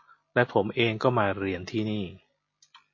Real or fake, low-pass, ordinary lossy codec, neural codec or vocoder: real; 7.2 kHz; MP3, 32 kbps; none